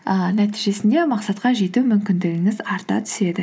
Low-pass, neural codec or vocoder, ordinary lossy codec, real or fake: none; none; none; real